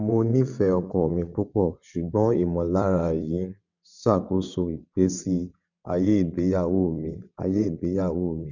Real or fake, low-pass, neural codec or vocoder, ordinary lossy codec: fake; 7.2 kHz; vocoder, 22.05 kHz, 80 mel bands, WaveNeXt; none